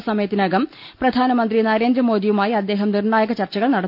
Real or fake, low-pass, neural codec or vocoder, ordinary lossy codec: real; 5.4 kHz; none; none